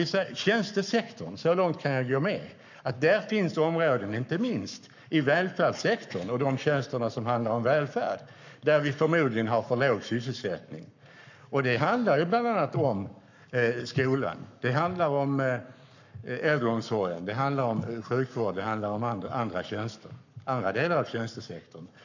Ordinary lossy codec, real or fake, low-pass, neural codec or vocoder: none; fake; 7.2 kHz; codec, 44.1 kHz, 7.8 kbps, Pupu-Codec